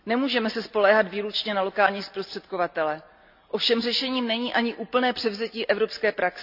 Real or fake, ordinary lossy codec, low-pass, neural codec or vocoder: real; none; 5.4 kHz; none